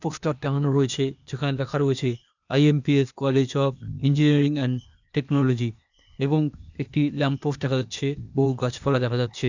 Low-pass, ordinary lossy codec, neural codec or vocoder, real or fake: 7.2 kHz; none; codec, 16 kHz, 0.8 kbps, ZipCodec; fake